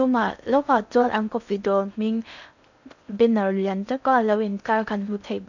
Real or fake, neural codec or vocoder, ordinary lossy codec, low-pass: fake; codec, 16 kHz in and 24 kHz out, 0.6 kbps, FocalCodec, streaming, 4096 codes; none; 7.2 kHz